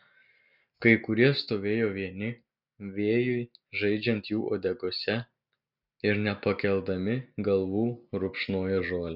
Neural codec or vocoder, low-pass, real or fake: none; 5.4 kHz; real